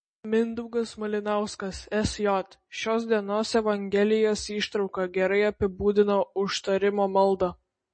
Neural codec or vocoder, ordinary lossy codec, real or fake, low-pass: none; MP3, 32 kbps; real; 9.9 kHz